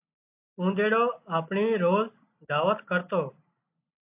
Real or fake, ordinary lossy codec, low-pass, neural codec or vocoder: real; AAC, 32 kbps; 3.6 kHz; none